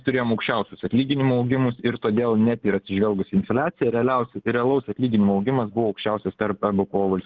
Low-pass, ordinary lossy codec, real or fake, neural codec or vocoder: 7.2 kHz; Opus, 16 kbps; real; none